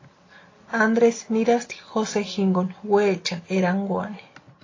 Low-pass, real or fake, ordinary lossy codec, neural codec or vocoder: 7.2 kHz; real; AAC, 32 kbps; none